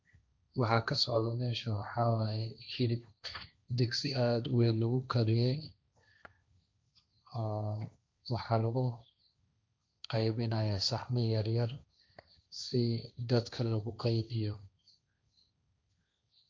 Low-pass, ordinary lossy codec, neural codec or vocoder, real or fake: none; none; codec, 16 kHz, 1.1 kbps, Voila-Tokenizer; fake